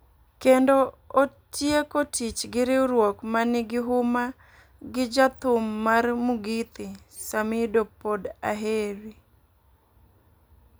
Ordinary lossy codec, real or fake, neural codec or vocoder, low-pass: none; real; none; none